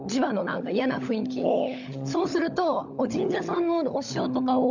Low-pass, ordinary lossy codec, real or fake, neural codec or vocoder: 7.2 kHz; none; fake; codec, 16 kHz, 16 kbps, FunCodec, trained on LibriTTS, 50 frames a second